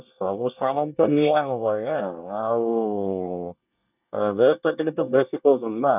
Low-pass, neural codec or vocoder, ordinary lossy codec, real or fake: 3.6 kHz; codec, 24 kHz, 1 kbps, SNAC; none; fake